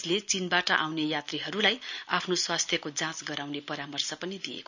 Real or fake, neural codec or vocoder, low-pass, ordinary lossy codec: real; none; 7.2 kHz; none